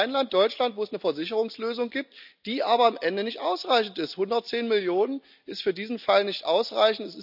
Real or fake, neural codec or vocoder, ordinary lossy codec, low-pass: real; none; none; 5.4 kHz